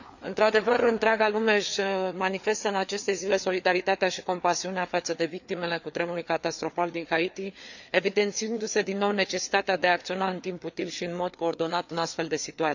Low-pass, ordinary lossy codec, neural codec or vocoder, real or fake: 7.2 kHz; none; codec, 16 kHz, 4 kbps, FreqCodec, larger model; fake